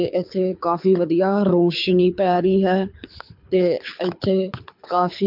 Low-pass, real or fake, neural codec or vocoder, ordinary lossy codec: 5.4 kHz; fake; codec, 24 kHz, 6 kbps, HILCodec; MP3, 48 kbps